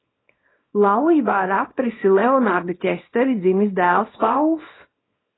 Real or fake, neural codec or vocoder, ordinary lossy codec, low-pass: fake; codec, 24 kHz, 0.9 kbps, WavTokenizer, small release; AAC, 16 kbps; 7.2 kHz